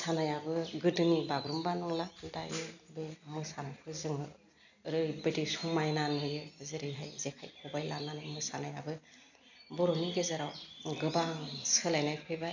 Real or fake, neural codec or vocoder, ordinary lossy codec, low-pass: real; none; none; 7.2 kHz